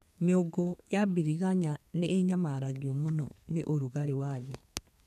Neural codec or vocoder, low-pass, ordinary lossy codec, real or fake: codec, 32 kHz, 1.9 kbps, SNAC; 14.4 kHz; none; fake